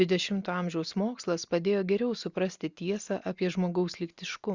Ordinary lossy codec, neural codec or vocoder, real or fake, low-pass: Opus, 64 kbps; none; real; 7.2 kHz